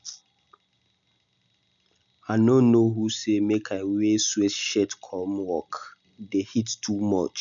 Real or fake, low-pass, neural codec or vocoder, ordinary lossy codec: real; 7.2 kHz; none; none